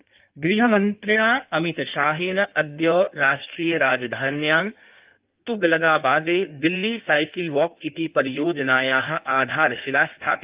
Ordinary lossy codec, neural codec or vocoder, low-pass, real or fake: Opus, 32 kbps; codec, 16 kHz in and 24 kHz out, 1.1 kbps, FireRedTTS-2 codec; 3.6 kHz; fake